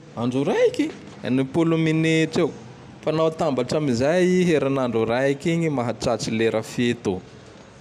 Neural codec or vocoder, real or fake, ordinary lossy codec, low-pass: none; real; none; 14.4 kHz